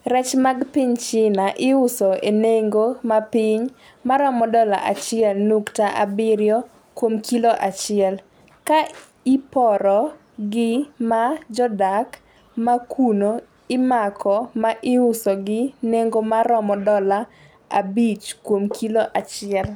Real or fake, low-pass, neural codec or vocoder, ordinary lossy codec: real; none; none; none